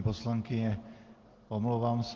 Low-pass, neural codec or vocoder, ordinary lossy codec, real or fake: 7.2 kHz; none; Opus, 16 kbps; real